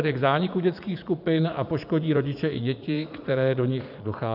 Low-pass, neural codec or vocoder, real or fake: 5.4 kHz; vocoder, 44.1 kHz, 80 mel bands, Vocos; fake